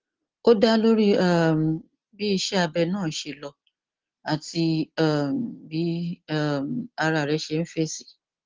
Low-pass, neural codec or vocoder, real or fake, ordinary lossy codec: 7.2 kHz; none; real; Opus, 16 kbps